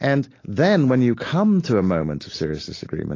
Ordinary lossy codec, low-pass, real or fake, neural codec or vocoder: AAC, 32 kbps; 7.2 kHz; real; none